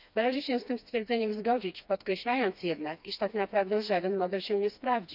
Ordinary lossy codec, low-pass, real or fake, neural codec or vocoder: none; 5.4 kHz; fake; codec, 16 kHz, 2 kbps, FreqCodec, smaller model